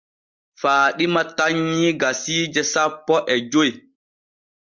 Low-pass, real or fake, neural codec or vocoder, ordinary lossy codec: 7.2 kHz; real; none; Opus, 32 kbps